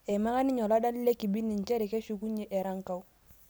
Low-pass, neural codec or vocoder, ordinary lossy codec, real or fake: none; none; none; real